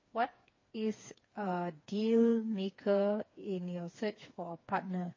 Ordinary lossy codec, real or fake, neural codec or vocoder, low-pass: MP3, 32 kbps; fake; codec, 16 kHz, 4 kbps, FreqCodec, smaller model; 7.2 kHz